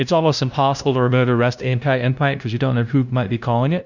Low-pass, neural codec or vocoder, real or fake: 7.2 kHz; codec, 16 kHz, 0.5 kbps, FunCodec, trained on LibriTTS, 25 frames a second; fake